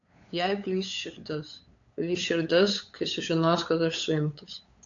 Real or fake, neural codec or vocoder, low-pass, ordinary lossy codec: fake; codec, 16 kHz, 8 kbps, FunCodec, trained on LibriTTS, 25 frames a second; 7.2 kHz; AAC, 48 kbps